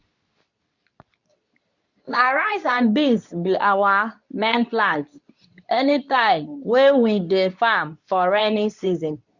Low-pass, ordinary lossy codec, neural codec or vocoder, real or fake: 7.2 kHz; none; codec, 24 kHz, 0.9 kbps, WavTokenizer, medium speech release version 2; fake